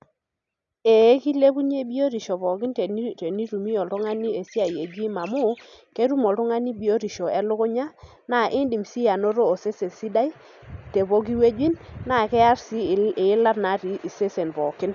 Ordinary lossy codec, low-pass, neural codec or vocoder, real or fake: none; 7.2 kHz; none; real